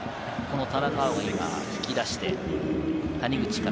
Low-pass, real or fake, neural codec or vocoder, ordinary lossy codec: none; real; none; none